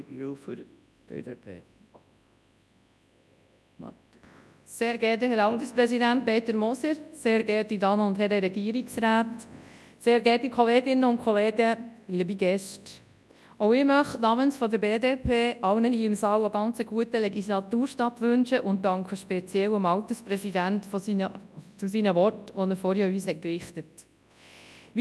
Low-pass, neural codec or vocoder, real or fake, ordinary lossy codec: none; codec, 24 kHz, 0.9 kbps, WavTokenizer, large speech release; fake; none